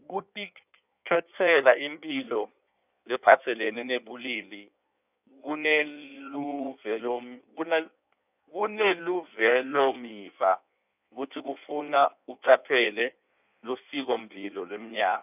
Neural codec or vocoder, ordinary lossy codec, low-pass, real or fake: codec, 16 kHz in and 24 kHz out, 1.1 kbps, FireRedTTS-2 codec; none; 3.6 kHz; fake